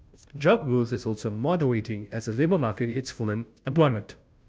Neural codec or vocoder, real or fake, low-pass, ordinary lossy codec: codec, 16 kHz, 0.5 kbps, FunCodec, trained on Chinese and English, 25 frames a second; fake; none; none